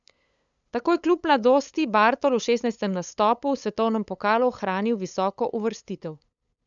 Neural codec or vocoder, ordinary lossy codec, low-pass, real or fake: codec, 16 kHz, 8 kbps, FunCodec, trained on LibriTTS, 25 frames a second; none; 7.2 kHz; fake